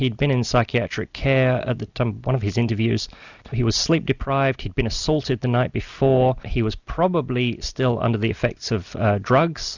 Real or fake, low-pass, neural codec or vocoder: real; 7.2 kHz; none